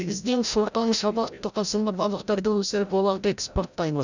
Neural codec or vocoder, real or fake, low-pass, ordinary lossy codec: codec, 16 kHz, 0.5 kbps, FreqCodec, larger model; fake; 7.2 kHz; none